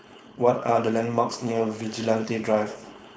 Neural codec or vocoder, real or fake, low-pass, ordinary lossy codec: codec, 16 kHz, 4.8 kbps, FACodec; fake; none; none